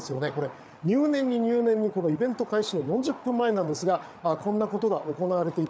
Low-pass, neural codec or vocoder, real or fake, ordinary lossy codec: none; codec, 16 kHz, 8 kbps, FreqCodec, larger model; fake; none